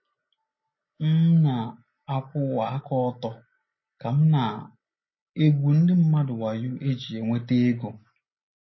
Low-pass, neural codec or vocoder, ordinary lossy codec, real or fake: 7.2 kHz; none; MP3, 24 kbps; real